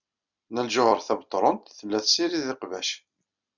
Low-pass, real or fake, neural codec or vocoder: 7.2 kHz; real; none